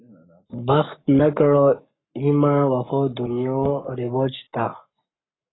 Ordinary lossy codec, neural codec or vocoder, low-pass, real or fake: AAC, 16 kbps; codec, 44.1 kHz, 7.8 kbps, Pupu-Codec; 7.2 kHz; fake